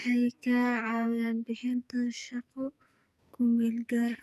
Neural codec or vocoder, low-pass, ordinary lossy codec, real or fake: codec, 44.1 kHz, 2.6 kbps, SNAC; 14.4 kHz; none; fake